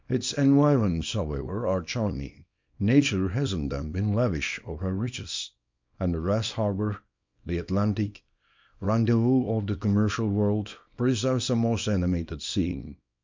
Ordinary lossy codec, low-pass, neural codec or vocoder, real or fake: MP3, 64 kbps; 7.2 kHz; codec, 24 kHz, 0.9 kbps, WavTokenizer, small release; fake